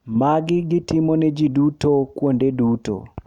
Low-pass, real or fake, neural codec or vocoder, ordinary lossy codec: 19.8 kHz; real; none; none